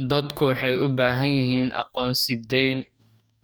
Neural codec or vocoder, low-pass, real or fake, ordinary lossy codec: codec, 44.1 kHz, 2.6 kbps, DAC; none; fake; none